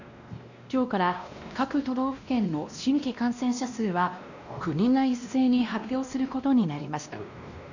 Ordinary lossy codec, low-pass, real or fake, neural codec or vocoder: none; 7.2 kHz; fake; codec, 16 kHz, 1 kbps, X-Codec, WavLM features, trained on Multilingual LibriSpeech